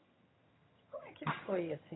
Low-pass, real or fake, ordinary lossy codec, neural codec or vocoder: 7.2 kHz; fake; AAC, 16 kbps; vocoder, 22.05 kHz, 80 mel bands, HiFi-GAN